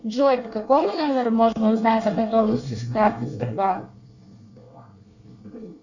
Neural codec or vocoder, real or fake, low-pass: codec, 24 kHz, 1 kbps, SNAC; fake; 7.2 kHz